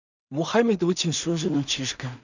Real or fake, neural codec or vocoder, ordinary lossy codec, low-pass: fake; codec, 16 kHz in and 24 kHz out, 0.4 kbps, LongCat-Audio-Codec, two codebook decoder; none; 7.2 kHz